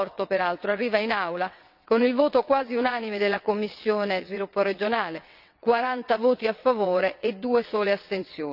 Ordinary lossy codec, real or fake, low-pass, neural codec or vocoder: none; fake; 5.4 kHz; vocoder, 22.05 kHz, 80 mel bands, WaveNeXt